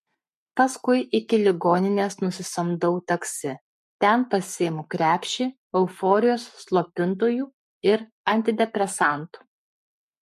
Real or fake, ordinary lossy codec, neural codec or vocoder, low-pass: fake; MP3, 64 kbps; codec, 44.1 kHz, 7.8 kbps, Pupu-Codec; 14.4 kHz